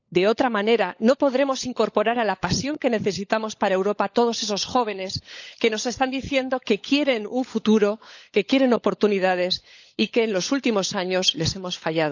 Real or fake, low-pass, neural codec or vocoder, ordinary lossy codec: fake; 7.2 kHz; codec, 16 kHz, 16 kbps, FunCodec, trained on LibriTTS, 50 frames a second; none